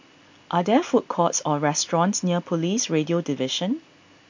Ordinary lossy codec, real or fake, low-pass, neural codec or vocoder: MP3, 48 kbps; real; 7.2 kHz; none